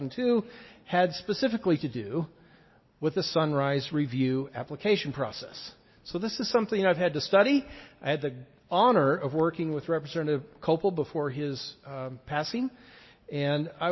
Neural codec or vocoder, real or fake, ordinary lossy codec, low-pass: none; real; MP3, 24 kbps; 7.2 kHz